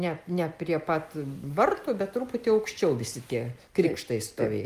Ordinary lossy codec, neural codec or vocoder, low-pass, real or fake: Opus, 32 kbps; none; 14.4 kHz; real